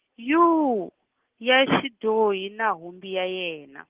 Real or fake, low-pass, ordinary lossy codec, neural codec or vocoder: real; 3.6 kHz; Opus, 16 kbps; none